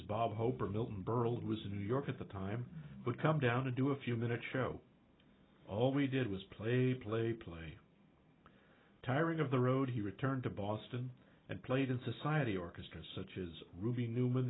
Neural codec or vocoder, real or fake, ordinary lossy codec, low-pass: none; real; AAC, 16 kbps; 7.2 kHz